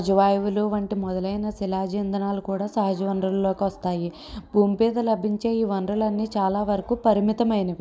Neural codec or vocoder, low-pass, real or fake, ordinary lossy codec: none; none; real; none